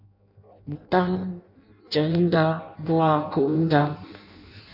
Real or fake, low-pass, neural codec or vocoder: fake; 5.4 kHz; codec, 16 kHz in and 24 kHz out, 0.6 kbps, FireRedTTS-2 codec